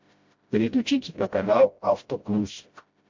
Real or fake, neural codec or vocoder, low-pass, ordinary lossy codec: fake; codec, 16 kHz, 0.5 kbps, FreqCodec, smaller model; 7.2 kHz; MP3, 48 kbps